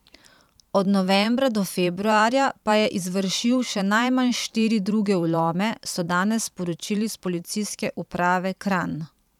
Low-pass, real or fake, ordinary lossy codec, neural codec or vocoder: 19.8 kHz; fake; none; vocoder, 44.1 kHz, 128 mel bands every 512 samples, BigVGAN v2